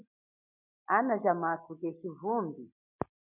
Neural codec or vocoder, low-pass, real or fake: none; 3.6 kHz; real